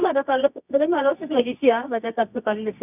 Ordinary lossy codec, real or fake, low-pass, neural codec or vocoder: none; fake; 3.6 kHz; codec, 24 kHz, 0.9 kbps, WavTokenizer, medium music audio release